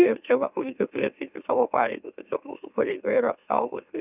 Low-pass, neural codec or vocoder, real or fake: 3.6 kHz; autoencoder, 44.1 kHz, a latent of 192 numbers a frame, MeloTTS; fake